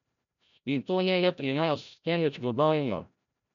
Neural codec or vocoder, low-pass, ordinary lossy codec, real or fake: codec, 16 kHz, 0.5 kbps, FreqCodec, larger model; 7.2 kHz; none; fake